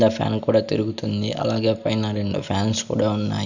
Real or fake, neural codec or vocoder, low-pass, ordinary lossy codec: real; none; 7.2 kHz; none